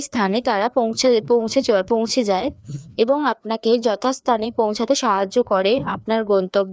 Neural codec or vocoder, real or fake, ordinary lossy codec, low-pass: codec, 16 kHz, 2 kbps, FreqCodec, larger model; fake; none; none